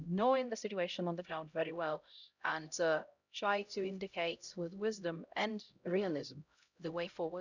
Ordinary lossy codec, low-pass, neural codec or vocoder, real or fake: none; 7.2 kHz; codec, 16 kHz, 0.5 kbps, X-Codec, HuBERT features, trained on LibriSpeech; fake